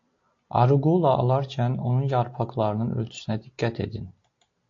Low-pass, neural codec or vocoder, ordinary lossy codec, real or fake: 7.2 kHz; none; AAC, 48 kbps; real